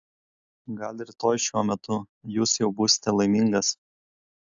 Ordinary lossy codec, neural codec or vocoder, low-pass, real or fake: AAC, 64 kbps; none; 7.2 kHz; real